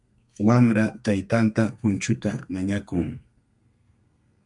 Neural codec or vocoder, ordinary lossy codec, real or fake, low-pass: codec, 32 kHz, 1.9 kbps, SNAC; MP3, 64 kbps; fake; 10.8 kHz